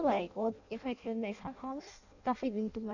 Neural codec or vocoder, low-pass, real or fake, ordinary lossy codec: codec, 16 kHz in and 24 kHz out, 0.6 kbps, FireRedTTS-2 codec; 7.2 kHz; fake; none